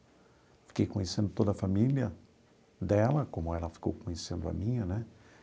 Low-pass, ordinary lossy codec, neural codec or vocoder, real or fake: none; none; none; real